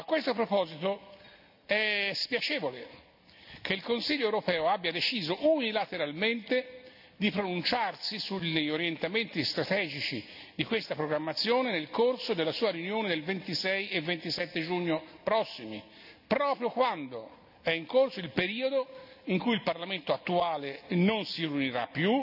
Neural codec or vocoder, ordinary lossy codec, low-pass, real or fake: none; none; 5.4 kHz; real